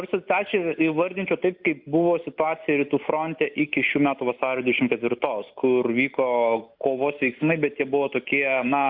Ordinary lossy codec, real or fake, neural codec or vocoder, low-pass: Opus, 64 kbps; real; none; 5.4 kHz